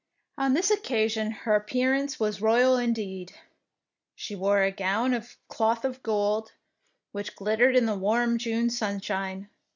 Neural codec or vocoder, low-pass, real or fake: none; 7.2 kHz; real